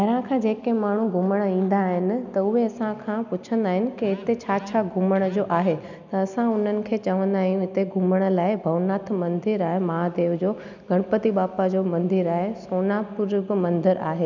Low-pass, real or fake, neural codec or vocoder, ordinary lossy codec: 7.2 kHz; real; none; none